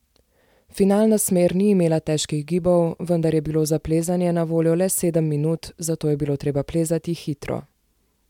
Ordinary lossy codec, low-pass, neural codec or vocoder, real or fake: MP3, 96 kbps; 19.8 kHz; none; real